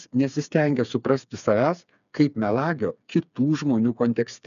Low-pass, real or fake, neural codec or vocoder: 7.2 kHz; fake; codec, 16 kHz, 4 kbps, FreqCodec, smaller model